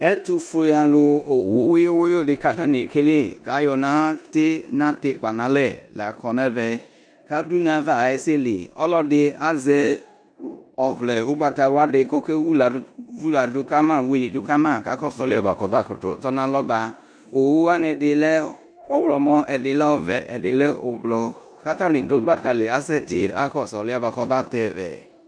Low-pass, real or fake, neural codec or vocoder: 9.9 kHz; fake; codec, 16 kHz in and 24 kHz out, 0.9 kbps, LongCat-Audio-Codec, four codebook decoder